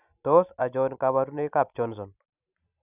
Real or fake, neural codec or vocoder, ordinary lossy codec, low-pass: fake; vocoder, 44.1 kHz, 128 mel bands every 256 samples, BigVGAN v2; none; 3.6 kHz